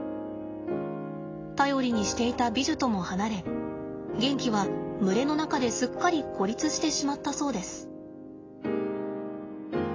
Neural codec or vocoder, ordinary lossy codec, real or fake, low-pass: none; AAC, 32 kbps; real; 7.2 kHz